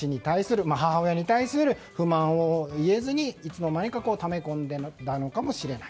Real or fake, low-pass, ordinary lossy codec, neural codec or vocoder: real; none; none; none